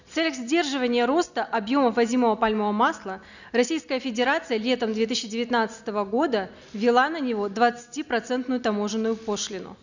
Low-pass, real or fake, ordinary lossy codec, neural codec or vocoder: 7.2 kHz; real; none; none